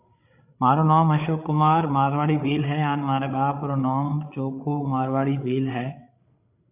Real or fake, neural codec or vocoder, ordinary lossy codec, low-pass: fake; codec, 16 kHz, 8 kbps, FreqCodec, larger model; AAC, 32 kbps; 3.6 kHz